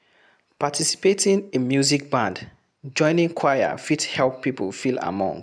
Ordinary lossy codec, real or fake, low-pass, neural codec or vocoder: none; real; none; none